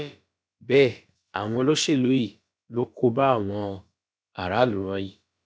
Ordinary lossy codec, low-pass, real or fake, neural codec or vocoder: none; none; fake; codec, 16 kHz, about 1 kbps, DyCAST, with the encoder's durations